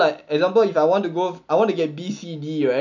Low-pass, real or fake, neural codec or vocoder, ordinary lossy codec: 7.2 kHz; real; none; none